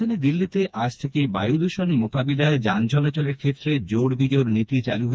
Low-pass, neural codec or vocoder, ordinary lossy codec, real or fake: none; codec, 16 kHz, 2 kbps, FreqCodec, smaller model; none; fake